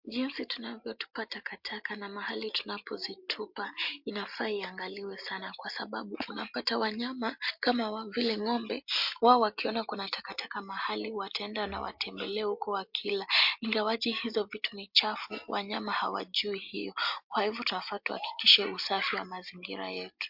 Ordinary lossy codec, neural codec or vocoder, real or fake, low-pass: MP3, 48 kbps; none; real; 5.4 kHz